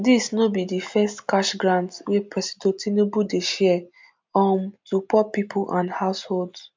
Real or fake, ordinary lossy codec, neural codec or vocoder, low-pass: real; MP3, 64 kbps; none; 7.2 kHz